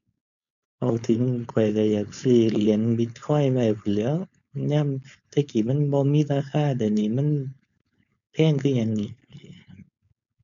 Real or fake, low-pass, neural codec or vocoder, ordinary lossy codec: fake; 7.2 kHz; codec, 16 kHz, 4.8 kbps, FACodec; none